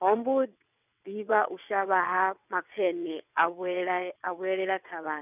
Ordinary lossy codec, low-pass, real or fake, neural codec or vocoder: none; 3.6 kHz; fake; vocoder, 22.05 kHz, 80 mel bands, WaveNeXt